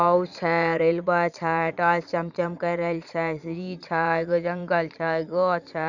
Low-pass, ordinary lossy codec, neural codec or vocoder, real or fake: 7.2 kHz; none; vocoder, 22.05 kHz, 80 mel bands, Vocos; fake